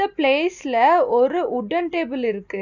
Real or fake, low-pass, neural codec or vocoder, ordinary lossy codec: real; 7.2 kHz; none; none